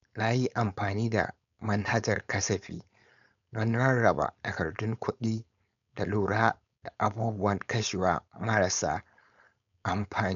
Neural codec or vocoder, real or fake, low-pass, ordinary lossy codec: codec, 16 kHz, 4.8 kbps, FACodec; fake; 7.2 kHz; none